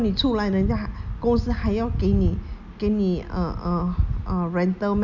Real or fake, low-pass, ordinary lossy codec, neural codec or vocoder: real; 7.2 kHz; none; none